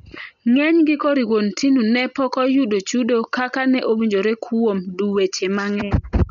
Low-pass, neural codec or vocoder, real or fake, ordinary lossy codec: 7.2 kHz; none; real; none